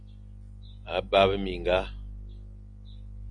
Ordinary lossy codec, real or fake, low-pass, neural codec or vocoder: MP3, 64 kbps; real; 9.9 kHz; none